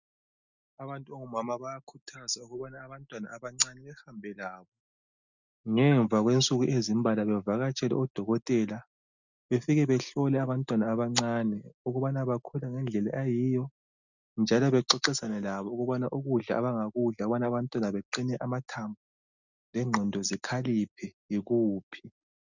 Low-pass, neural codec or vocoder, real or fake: 7.2 kHz; none; real